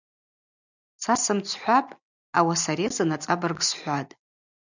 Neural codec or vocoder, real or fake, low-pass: none; real; 7.2 kHz